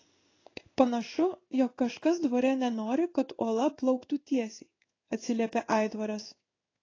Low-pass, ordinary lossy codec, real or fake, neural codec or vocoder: 7.2 kHz; AAC, 32 kbps; fake; codec, 16 kHz in and 24 kHz out, 1 kbps, XY-Tokenizer